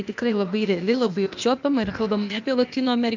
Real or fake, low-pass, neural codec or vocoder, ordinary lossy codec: fake; 7.2 kHz; codec, 16 kHz, 0.8 kbps, ZipCodec; MP3, 64 kbps